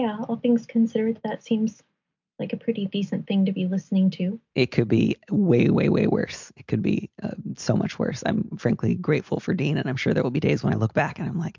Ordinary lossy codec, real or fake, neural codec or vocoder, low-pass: MP3, 64 kbps; real; none; 7.2 kHz